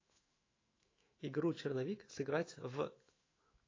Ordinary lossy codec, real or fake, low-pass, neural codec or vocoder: AAC, 32 kbps; fake; 7.2 kHz; autoencoder, 48 kHz, 128 numbers a frame, DAC-VAE, trained on Japanese speech